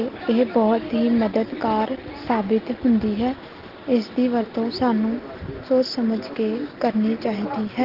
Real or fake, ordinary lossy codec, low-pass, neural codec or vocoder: real; Opus, 16 kbps; 5.4 kHz; none